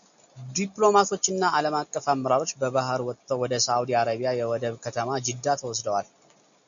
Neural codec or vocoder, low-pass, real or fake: none; 7.2 kHz; real